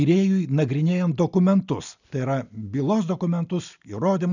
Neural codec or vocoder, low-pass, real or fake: none; 7.2 kHz; real